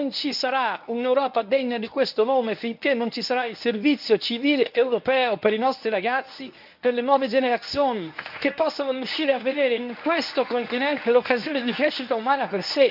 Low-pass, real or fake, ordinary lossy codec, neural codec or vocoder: 5.4 kHz; fake; none; codec, 24 kHz, 0.9 kbps, WavTokenizer, medium speech release version 1